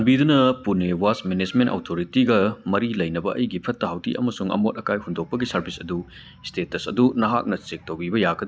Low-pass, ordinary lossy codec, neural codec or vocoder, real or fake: none; none; none; real